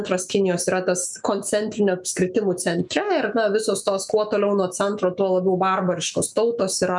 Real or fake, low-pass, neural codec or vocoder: fake; 10.8 kHz; autoencoder, 48 kHz, 128 numbers a frame, DAC-VAE, trained on Japanese speech